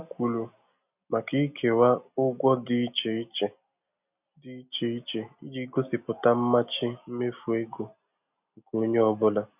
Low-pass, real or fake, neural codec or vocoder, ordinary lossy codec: 3.6 kHz; real; none; none